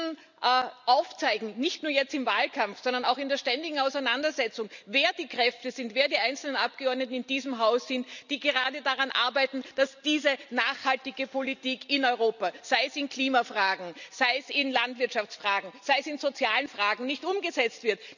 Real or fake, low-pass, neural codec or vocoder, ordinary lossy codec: real; 7.2 kHz; none; none